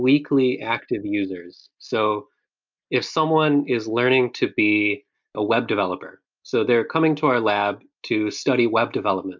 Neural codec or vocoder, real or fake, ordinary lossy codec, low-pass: none; real; MP3, 64 kbps; 7.2 kHz